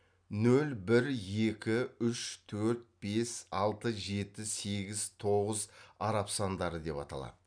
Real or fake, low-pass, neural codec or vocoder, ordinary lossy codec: real; 9.9 kHz; none; none